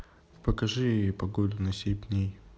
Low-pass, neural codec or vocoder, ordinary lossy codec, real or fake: none; none; none; real